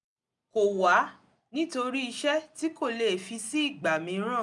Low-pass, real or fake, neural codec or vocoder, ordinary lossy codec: 10.8 kHz; real; none; none